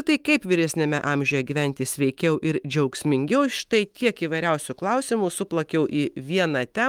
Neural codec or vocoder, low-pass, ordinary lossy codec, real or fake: autoencoder, 48 kHz, 128 numbers a frame, DAC-VAE, trained on Japanese speech; 19.8 kHz; Opus, 32 kbps; fake